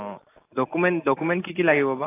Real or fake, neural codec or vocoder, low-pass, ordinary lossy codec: real; none; 3.6 kHz; AAC, 24 kbps